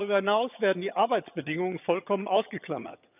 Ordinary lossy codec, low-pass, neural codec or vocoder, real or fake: none; 3.6 kHz; vocoder, 22.05 kHz, 80 mel bands, HiFi-GAN; fake